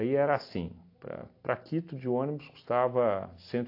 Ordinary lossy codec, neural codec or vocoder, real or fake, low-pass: AAC, 32 kbps; none; real; 5.4 kHz